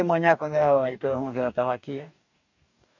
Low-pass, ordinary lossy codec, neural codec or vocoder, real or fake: 7.2 kHz; none; codec, 44.1 kHz, 2.6 kbps, DAC; fake